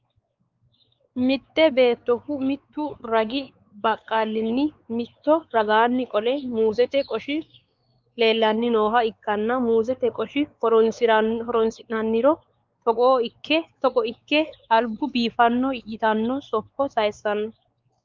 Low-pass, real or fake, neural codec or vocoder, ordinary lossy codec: 7.2 kHz; fake; codec, 16 kHz, 4 kbps, X-Codec, WavLM features, trained on Multilingual LibriSpeech; Opus, 24 kbps